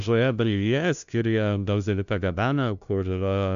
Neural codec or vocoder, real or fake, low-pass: codec, 16 kHz, 0.5 kbps, FunCodec, trained on LibriTTS, 25 frames a second; fake; 7.2 kHz